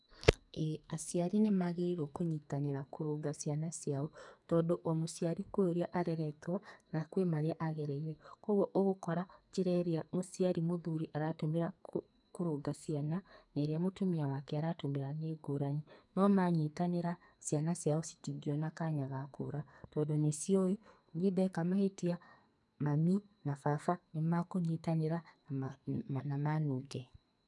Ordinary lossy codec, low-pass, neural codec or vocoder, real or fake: none; 10.8 kHz; codec, 44.1 kHz, 2.6 kbps, SNAC; fake